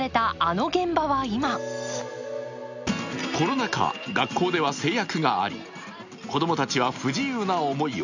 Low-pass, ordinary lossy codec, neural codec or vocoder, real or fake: 7.2 kHz; none; none; real